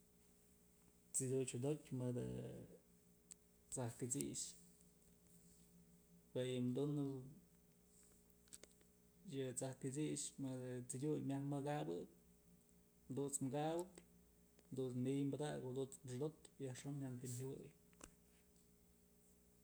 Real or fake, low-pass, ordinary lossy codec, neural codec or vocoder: real; none; none; none